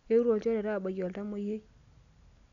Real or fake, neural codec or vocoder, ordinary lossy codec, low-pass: real; none; MP3, 96 kbps; 7.2 kHz